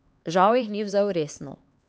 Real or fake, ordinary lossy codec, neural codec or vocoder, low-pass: fake; none; codec, 16 kHz, 4 kbps, X-Codec, HuBERT features, trained on LibriSpeech; none